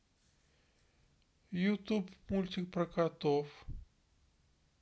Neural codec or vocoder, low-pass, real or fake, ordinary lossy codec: none; none; real; none